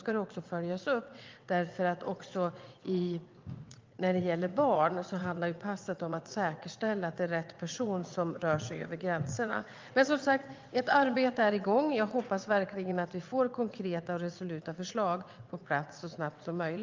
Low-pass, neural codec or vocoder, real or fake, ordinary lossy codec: 7.2 kHz; vocoder, 22.05 kHz, 80 mel bands, WaveNeXt; fake; Opus, 24 kbps